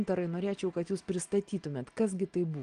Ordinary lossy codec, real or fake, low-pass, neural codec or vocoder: Opus, 24 kbps; real; 9.9 kHz; none